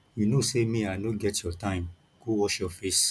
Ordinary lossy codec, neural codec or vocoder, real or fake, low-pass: none; none; real; none